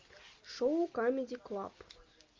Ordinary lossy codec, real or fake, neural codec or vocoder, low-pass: Opus, 32 kbps; real; none; 7.2 kHz